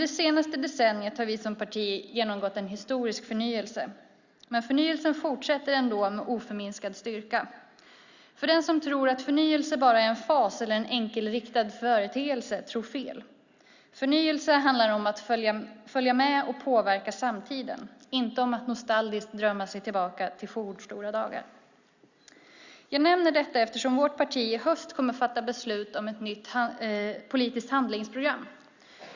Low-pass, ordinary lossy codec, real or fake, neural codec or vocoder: 7.2 kHz; Opus, 64 kbps; real; none